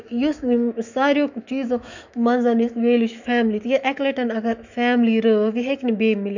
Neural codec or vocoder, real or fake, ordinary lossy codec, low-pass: autoencoder, 48 kHz, 128 numbers a frame, DAC-VAE, trained on Japanese speech; fake; none; 7.2 kHz